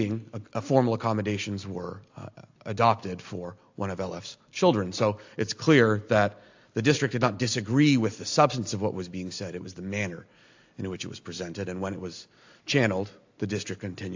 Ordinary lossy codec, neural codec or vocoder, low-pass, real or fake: AAC, 48 kbps; none; 7.2 kHz; real